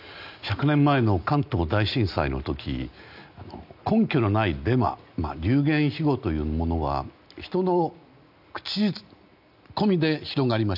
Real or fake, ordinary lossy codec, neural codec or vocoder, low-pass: real; none; none; 5.4 kHz